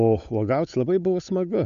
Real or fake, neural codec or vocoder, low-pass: fake; codec, 16 kHz, 8 kbps, FunCodec, trained on Chinese and English, 25 frames a second; 7.2 kHz